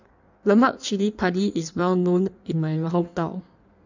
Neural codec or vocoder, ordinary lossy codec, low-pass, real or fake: codec, 16 kHz in and 24 kHz out, 1.1 kbps, FireRedTTS-2 codec; none; 7.2 kHz; fake